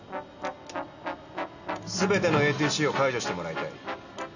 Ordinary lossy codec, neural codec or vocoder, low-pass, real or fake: none; none; 7.2 kHz; real